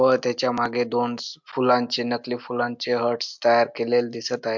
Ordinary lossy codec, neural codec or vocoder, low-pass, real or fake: MP3, 64 kbps; none; 7.2 kHz; real